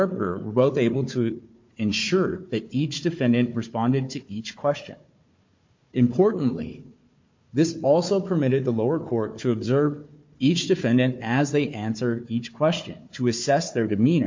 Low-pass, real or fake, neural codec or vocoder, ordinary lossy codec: 7.2 kHz; fake; codec, 16 kHz, 4 kbps, FunCodec, trained on Chinese and English, 50 frames a second; MP3, 48 kbps